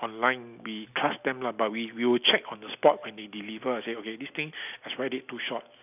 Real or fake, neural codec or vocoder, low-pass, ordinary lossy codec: real; none; 3.6 kHz; none